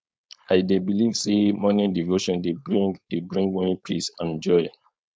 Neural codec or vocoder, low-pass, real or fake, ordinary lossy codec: codec, 16 kHz, 4.8 kbps, FACodec; none; fake; none